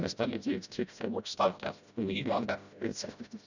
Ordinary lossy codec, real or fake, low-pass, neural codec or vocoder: none; fake; 7.2 kHz; codec, 16 kHz, 0.5 kbps, FreqCodec, smaller model